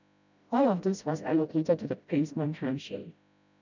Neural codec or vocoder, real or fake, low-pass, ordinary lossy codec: codec, 16 kHz, 0.5 kbps, FreqCodec, smaller model; fake; 7.2 kHz; none